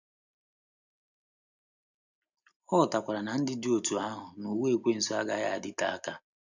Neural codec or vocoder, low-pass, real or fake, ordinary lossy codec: none; 7.2 kHz; real; none